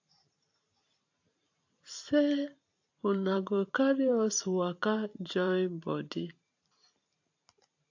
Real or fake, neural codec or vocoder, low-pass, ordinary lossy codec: fake; vocoder, 44.1 kHz, 128 mel bands every 512 samples, BigVGAN v2; 7.2 kHz; AAC, 48 kbps